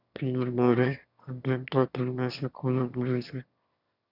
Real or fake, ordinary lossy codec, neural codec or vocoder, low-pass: fake; Opus, 64 kbps; autoencoder, 22.05 kHz, a latent of 192 numbers a frame, VITS, trained on one speaker; 5.4 kHz